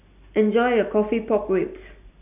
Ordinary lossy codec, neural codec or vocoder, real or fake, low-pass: none; none; real; 3.6 kHz